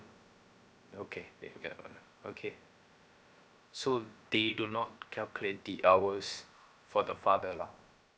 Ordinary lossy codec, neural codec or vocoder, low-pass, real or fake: none; codec, 16 kHz, about 1 kbps, DyCAST, with the encoder's durations; none; fake